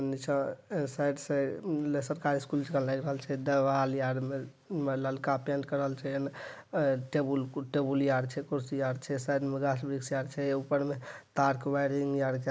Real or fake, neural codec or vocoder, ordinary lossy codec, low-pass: real; none; none; none